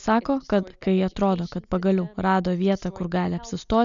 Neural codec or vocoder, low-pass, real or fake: none; 7.2 kHz; real